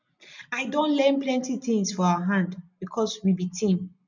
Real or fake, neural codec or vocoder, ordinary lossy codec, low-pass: real; none; none; 7.2 kHz